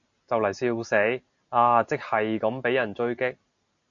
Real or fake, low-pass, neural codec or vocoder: real; 7.2 kHz; none